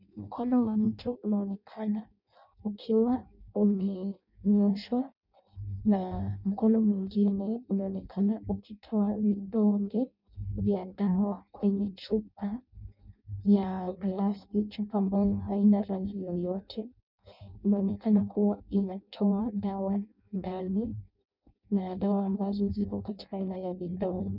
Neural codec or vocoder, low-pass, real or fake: codec, 16 kHz in and 24 kHz out, 0.6 kbps, FireRedTTS-2 codec; 5.4 kHz; fake